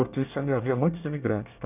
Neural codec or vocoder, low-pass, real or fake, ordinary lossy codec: codec, 24 kHz, 1 kbps, SNAC; 3.6 kHz; fake; none